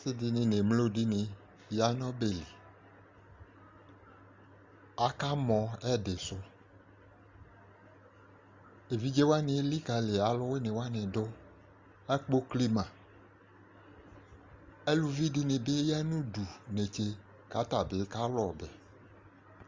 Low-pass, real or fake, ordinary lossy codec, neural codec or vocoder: 7.2 kHz; real; Opus, 32 kbps; none